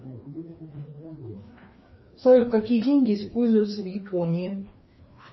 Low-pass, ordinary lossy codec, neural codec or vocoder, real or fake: 7.2 kHz; MP3, 24 kbps; codec, 16 kHz, 2 kbps, FreqCodec, larger model; fake